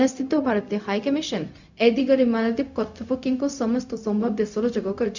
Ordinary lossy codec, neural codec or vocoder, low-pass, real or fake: none; codec, 16 kHz, 0.4 kbps, LongCat-Audio-Codec; 7.2 kHz; fake